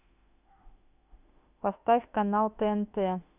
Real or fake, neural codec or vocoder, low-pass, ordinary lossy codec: fake; autoencoder, 48 kHz, 32 numbers a frame, DAC-VAE, trained on Japanese speech; 3.6 kHz; none